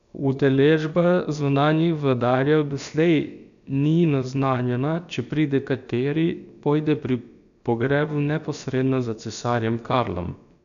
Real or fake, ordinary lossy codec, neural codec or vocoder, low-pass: fake; none; codec, 16 kHz, about 1 kbps, DyCAST, with the encoder's durations; 7.2 kHz